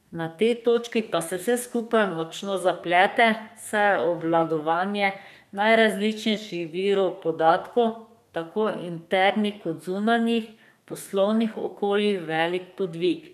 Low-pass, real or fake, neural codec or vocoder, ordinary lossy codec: 14.4 kHz; fake; codec, 32 kHz, 1.9 kbps, SNAC; none